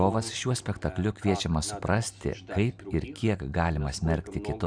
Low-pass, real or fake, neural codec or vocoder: 9.9 kHz; real; none